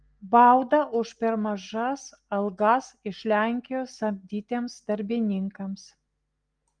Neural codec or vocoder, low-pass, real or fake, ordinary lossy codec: none; 9.9 kHz; real; Opus, 24 kbps